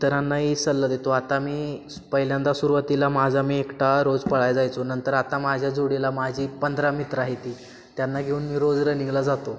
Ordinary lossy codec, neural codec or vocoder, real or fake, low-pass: none; none; real; none